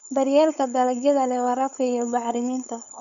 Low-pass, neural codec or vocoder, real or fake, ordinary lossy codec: 7.2 kHz; codec, 16 kHz, 4.8 kbps, FACodec; fake; Opus, 64 kbps